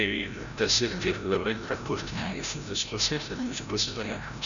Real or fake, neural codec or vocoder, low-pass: fake; codec, 16 kHz, 0.5 kbps, FreqCodec, larger model; 7.2 kHz